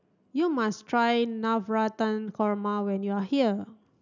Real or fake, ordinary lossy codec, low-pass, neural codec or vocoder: real; none; 7.2 kHz; none